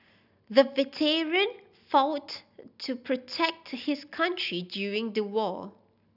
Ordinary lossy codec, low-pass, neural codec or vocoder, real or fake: none; 5.4 kHz; none; real